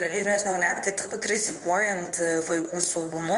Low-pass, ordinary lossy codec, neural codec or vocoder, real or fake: 10.8 kHz; Opus, 64 kbps; codec, 24 kHz, 0.9 kbps, WavTokenizer, medium speech release version 2; fake